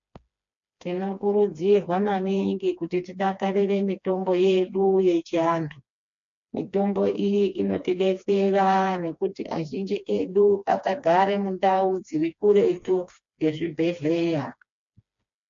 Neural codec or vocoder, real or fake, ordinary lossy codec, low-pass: codec, 16 kHz, 2 kbps, FreqCodec, smaller model; fake; MP3, 48 kbps; 7.2 kHz